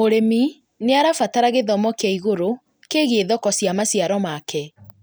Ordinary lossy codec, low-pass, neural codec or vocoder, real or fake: none; none; none; real